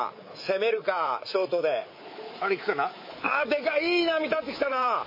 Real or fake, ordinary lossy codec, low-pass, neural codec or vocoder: fake; MP3, 24 kbps; 5.4 kHz; codec, 24 kHz, 3.1 kbps, DualCodec